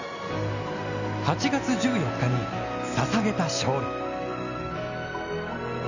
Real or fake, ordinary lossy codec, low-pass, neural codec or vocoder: real; none; 7.2 kHz; none